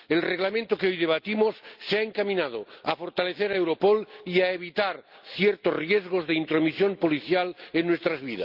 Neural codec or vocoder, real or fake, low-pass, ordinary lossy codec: none; real; 5.4 kHz; Opus, 24 kbps